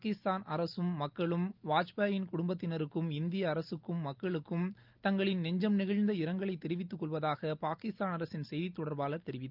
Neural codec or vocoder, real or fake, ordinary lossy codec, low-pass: none; real; Opus, 24 kbps; 5.4 kHz